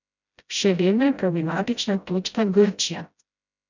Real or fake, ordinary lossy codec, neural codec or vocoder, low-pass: fake; none; codec, 16 kHz, 0.5 kbps, FreqCodec, smaller model; 7.2 kHz